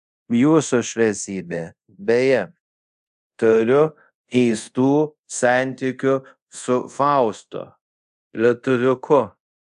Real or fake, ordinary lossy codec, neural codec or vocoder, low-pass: fake; AAC, 96 kbps; codec, 24 kHz, 0.5 kbps, DualCodec; 10.8 kHz